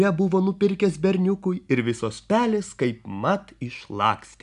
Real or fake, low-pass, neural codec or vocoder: real; 10.8 kHz; none